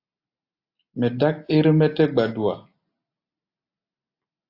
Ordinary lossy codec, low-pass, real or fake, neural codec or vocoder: AAC, 32 kbps; 5.4 kHz; real; none